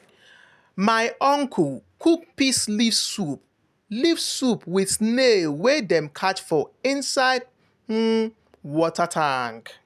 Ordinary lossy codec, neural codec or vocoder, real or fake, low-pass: none; none; real; 14.4 kHz